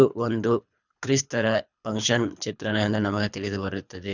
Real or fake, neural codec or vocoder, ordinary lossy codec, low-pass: fake; codec, 24 kHz, 3 kbps, HILCodec; none; 7.2 kHz